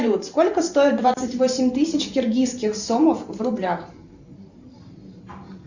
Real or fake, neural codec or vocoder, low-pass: fake; vocoder, 44.1 kHz, 128 mel bands every 512 samples, BigVGAN v2; 7.2 kHz